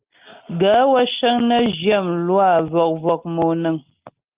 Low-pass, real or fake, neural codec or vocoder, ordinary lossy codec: 3.6 kHz; real; none; Opus, 32 kbps